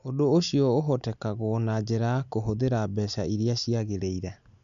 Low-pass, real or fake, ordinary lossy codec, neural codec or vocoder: 7.2 kHz; real; MP3, 96 kbps; none